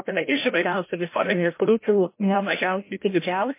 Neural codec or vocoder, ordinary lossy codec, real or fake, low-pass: codec, 16 kHz, 0.5 kbps, FreqCodec, larger model; MP3, 24 kbps; fake; 3.6 kHz